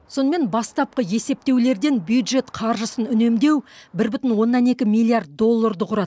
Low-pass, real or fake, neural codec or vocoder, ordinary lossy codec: none; real; none; none